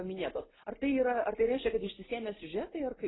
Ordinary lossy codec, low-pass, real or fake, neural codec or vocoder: AAC, 16 kbps; 7.2 kHz; real; none